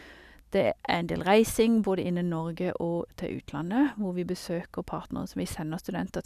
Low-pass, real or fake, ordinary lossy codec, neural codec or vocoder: 14.4 kHz; real; none; none